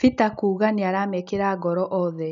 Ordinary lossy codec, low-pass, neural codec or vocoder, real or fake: none; 7.2 kHz; none; real